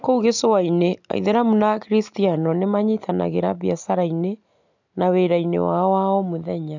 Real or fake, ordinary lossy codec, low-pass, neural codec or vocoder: real; none; 7.2 kHz; none